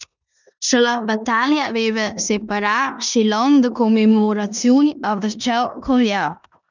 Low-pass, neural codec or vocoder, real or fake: 7.2 kHz; codec, 16 kHz in and 24 kHz out, 0.9 kbps, LongCat-Audio-Codec, four codebook decoder; fake